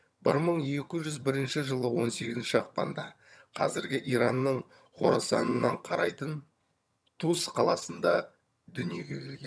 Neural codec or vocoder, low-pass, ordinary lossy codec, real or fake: vocoder, 22.05 kHz, 80 mel bands, HiFi-GAN; none; none; fake